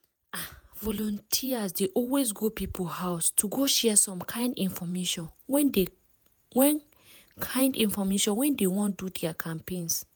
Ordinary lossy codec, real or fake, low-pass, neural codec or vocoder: none; fake; none; vocoder, 48 kHz, 128 mel bands, Vocos